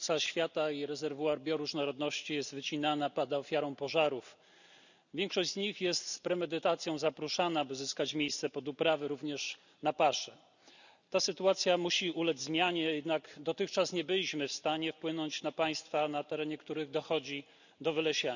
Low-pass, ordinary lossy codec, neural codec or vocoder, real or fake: 7.2 kHz; none; none; real